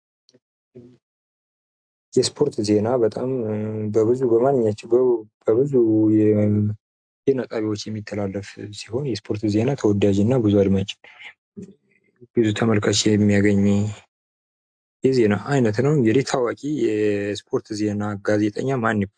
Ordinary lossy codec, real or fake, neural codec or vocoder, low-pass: AAC, 64 kbps; real; none; 9.9 kHz